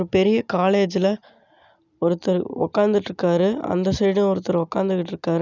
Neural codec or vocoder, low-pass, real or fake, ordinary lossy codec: none; 7.2 kHz; real; none